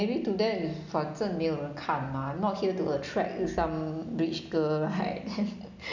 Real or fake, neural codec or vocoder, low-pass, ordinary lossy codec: real; none; 7.2 kHz; none